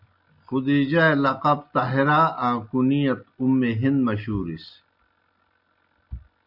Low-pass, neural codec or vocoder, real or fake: 5.4 kHz; none; real